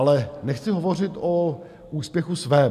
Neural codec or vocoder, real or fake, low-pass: none; real; 14.4 kHz